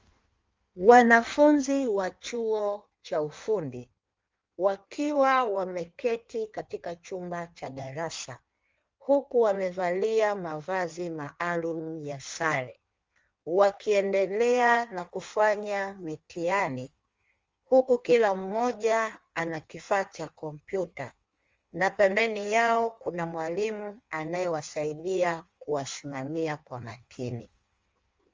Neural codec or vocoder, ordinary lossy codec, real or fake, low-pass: codec, 16 kHz in and 24 kHz out, 1.1 kbps, FireRedTTS-2 codec; Opus, 32 kbps; fake; 7.2 kHz